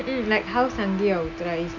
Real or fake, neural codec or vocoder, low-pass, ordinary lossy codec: real; none; 7.2 kHz; none